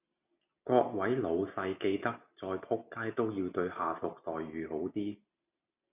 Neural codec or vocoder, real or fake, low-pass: none; real; 3.6 kHz